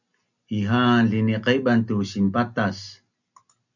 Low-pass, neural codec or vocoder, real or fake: 7.2 kHz; none; real